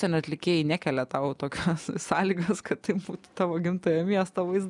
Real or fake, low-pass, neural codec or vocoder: real; 10.8 kHz; none